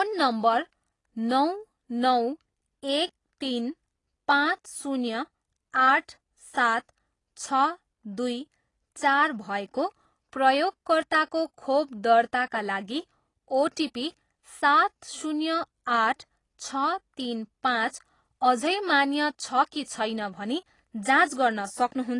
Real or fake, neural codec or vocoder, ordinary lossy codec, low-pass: real; none; AAC, 32 kbps; 10.8 kHz